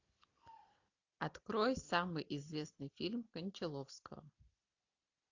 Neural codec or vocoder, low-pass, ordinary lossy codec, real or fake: none; 7.2 kHz; AAC, 48 kbps; real